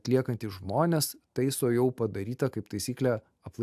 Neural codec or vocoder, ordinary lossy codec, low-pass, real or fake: none; AAC, 96 kbps; 14.4 kHz; real